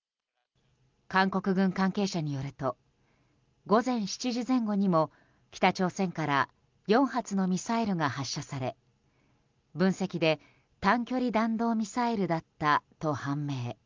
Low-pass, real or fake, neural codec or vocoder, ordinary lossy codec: 7.2 kHz; real; none; Opus, 24 kbps